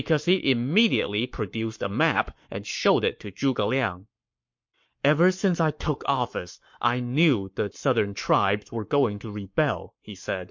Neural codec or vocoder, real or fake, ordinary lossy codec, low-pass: codec, 44.1 kHz, 7.8 kbps, Pupu-Codec; fake; MP3, 48 kbps; 7.2 kHz